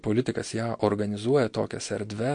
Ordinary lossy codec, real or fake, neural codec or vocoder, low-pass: MP3, 48 kbps; real; none; 9.9 kHz